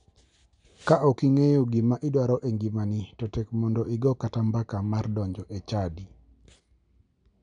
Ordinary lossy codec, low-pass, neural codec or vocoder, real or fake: none; 9.9 kHz; none; real